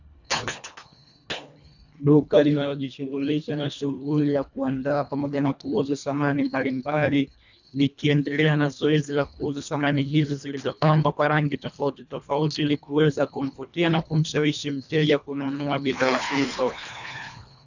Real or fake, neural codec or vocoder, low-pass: fake; codec, 24 kHz, 1.5 kbps, HILCodec; 7.2 kHz